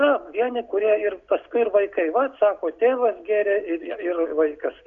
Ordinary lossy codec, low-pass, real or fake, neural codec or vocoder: MP3, 64 kbps; 7.2 kHz; real; none